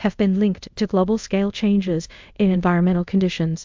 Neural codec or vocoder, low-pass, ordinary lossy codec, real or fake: codec, 24 kHz, 0.5 kbps, DualCodec; 7.2 kHz; MP3, 64 kbps; fake